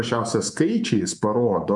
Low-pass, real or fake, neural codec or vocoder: 10.8 kHz; fake; codec, 24 kHz, 3.1 kbps, DualCodec